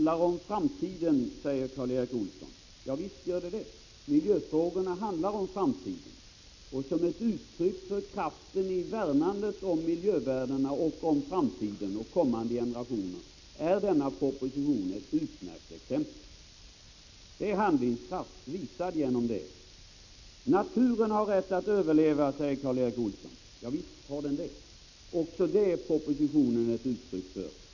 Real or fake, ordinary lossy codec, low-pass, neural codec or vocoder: real; none; 7.2 kHz; none